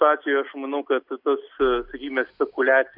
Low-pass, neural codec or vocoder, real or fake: 5.4 kHz; none; real